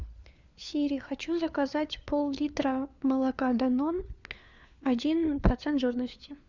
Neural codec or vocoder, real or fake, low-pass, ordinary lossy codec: codec, 16 kHz, 4 kbps, FunCodec, trained on LibriTTS, 50 frames a second; fake; 7.2 kHz; Opus, 64 kbps